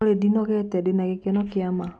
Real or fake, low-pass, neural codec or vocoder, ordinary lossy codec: real; none; none; none